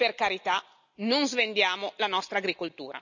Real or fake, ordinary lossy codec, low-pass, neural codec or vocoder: real; none; 7.2 kHz; none